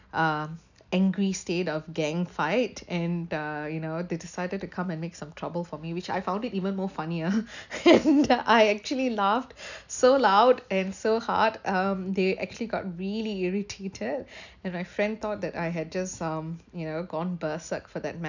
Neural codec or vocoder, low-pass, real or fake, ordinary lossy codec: none; 7.2 kHz; real; none